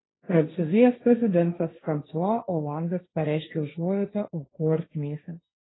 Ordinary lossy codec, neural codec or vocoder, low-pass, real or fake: AAC, 16 kbps; codec, 16 kHz, 1.1 kbps, Voila-Tokenizer; 7.2 kHz; fake